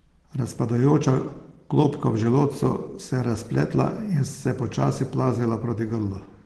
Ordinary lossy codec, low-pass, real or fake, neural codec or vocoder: Opus, 16 kbps; 10.8 kHz; real; none